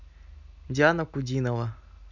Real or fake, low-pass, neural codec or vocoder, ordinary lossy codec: real; 7.2 kHz; none; none